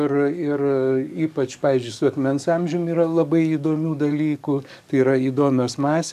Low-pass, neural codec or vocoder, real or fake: 14.4 kHz; codec, 44.1 kHz, 7.8 kbps, DAC; fake